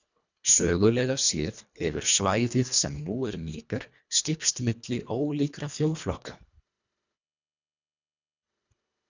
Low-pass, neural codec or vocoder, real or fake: 7.2 kHz; codec, 24 kHz, 1.5 kbps, HILCodec; fake